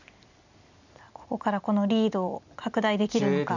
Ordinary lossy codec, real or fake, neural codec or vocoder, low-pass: none; real; none; 7.2 kHz